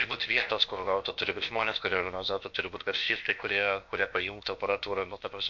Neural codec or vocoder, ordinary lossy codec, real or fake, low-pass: codec, 16 kHz, about 1 kbps, DyCAST, with the encoder's durations; MP3, 48 kbps; fake; 7.2 kHz